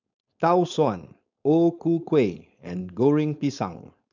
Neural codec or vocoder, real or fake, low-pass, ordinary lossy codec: codec, 16 kHz, 4.8 kbps, FACodec; fake; 7.2 kHz; none